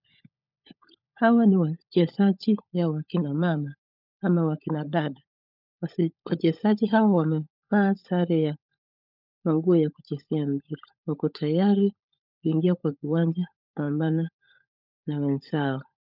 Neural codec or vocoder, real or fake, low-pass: codec, 16 kHz, 16 kbps, FunCodec, trained on LibriTTS, 50 frames a second; fake; 5.4 kHz